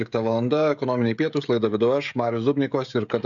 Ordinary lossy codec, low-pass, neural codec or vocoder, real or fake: MP3, 96 kbps; 7.2 kHz; codec, 16 kHz, 16 kbps, FreqCodec, smaller model; fake